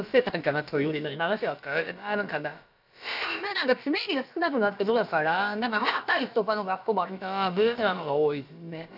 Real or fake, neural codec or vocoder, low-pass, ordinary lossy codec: fake; codec, 16 kHz, about 1 kbps, DyCAST, with the encoder's durations; 5.4 kHz; none